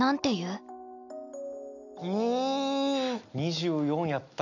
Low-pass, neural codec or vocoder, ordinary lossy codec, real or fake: 7.2 kHz; none; none; real